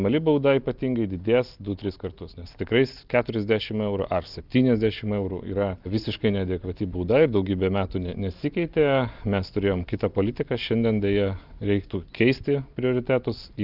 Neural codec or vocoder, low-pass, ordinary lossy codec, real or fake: none; 5.4 kHz; Opus, 32 kbps; real